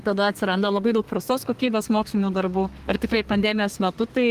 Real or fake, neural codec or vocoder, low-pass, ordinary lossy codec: fake; codec, 32 kHz, 1.9 kbps, SNAC; 14.4 kHz; Opus, 24 kbps